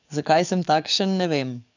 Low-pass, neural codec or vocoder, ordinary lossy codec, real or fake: 7.2 kHz; codec, 44.1 kHz, 7.8 kbps, DAC; none; fake